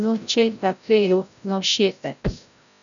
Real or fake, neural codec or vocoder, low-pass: fake; codec, 16 kHz, 0.5 kbps, FreqCodec, larger model; 7.2 kHz